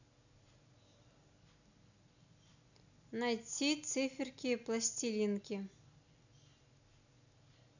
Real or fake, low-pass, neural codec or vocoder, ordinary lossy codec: real; 7.2 kHz; none; none